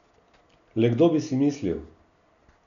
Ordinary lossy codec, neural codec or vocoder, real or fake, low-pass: none; none; real; 7.2 kHz